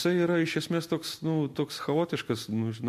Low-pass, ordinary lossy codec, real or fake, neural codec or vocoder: 14.4 kHz; MP3, 64 kbps; real; none